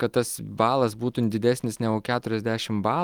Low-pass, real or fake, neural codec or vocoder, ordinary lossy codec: 19.8 kHz; real; none; Opus, 24 kbps